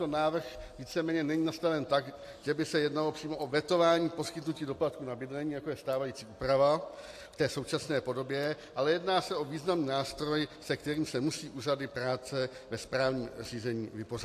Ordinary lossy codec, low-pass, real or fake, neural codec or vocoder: AAC, 64 kbps; 14.4 kHz; real; none